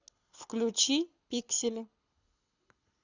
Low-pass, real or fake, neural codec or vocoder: 7.2 kHz; fake; codec, 24 kHz, 6 kbps, HILCodec